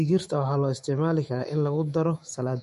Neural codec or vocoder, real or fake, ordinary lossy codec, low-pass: autoencoder, 48 kHz, 128 numbers a frame, DAC-VAE, trained on Japanese speech; fake; MP3, 48 kbps; 14.4 kHz